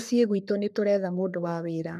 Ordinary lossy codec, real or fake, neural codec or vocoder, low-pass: none; fake; codec, 44.1 kHz, 3.4 kbps, Pupu-Codec; 14.4 kHz